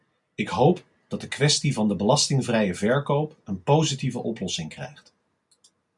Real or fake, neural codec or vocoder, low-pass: real; none; 10.8 kHz